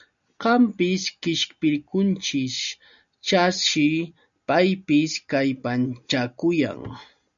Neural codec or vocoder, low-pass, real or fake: none; 7.2 kHz; real